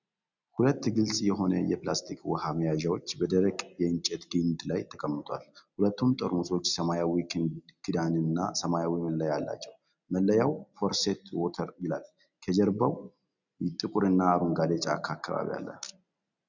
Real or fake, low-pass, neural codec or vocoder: real; 7.2 kHz; none